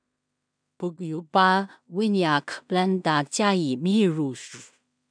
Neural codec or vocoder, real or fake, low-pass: codec, 16 kHz in and 24 kHz out, 0.4 kbps, LongCat-Audio-Codec, two codebook decoder; fake; 9.9 kHz